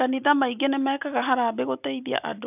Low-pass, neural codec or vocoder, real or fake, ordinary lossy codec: 3.6 kHz; none; real; none